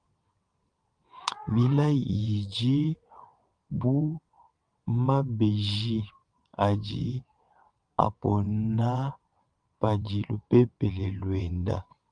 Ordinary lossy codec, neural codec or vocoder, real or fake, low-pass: Opus, 32 kbps; vocoder, 22.05 kHz, 80 mel bands, WaveNeXt; fake; 9.9 kHz